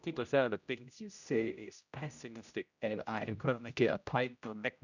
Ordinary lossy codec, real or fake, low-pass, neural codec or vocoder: none; fake; 7.2 kHz; codec, 16 kHz, 0.5 kbps, X-Codec, HuBERT features, trained on general audio